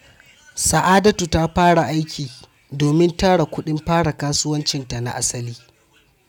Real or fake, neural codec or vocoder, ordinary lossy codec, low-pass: real; none; none; 19.8 kHz